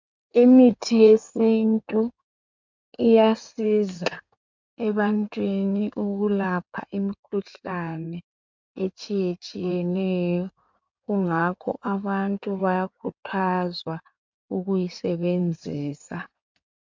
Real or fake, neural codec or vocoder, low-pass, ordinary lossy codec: fake; codec, 16 kHz in and 24 kHz out, 2.2 kbps, FireRedTTS-2 codec; 7.2 kHz; MP3, 64 kbps